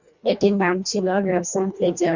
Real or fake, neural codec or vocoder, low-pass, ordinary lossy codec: fake; codec, 24 kHz, 1.5 kbps, HILCodec; 7.2 kHz; Opus, 64 kbps